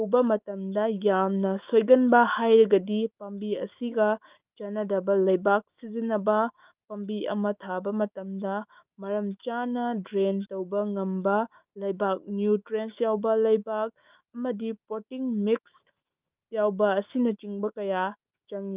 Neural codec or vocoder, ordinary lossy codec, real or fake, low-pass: autoencoder, 48 kHz, 128 numbers a frame, DAC-VAE, trained on Japanese speech; Opus, 24 kbps; fake; 3.6 kHz